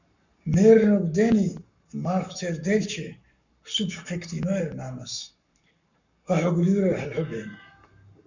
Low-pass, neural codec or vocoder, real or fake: 7.2 kHz; codec, 44.1 kHz, 7.8 kbps, Pupu-Codec; fake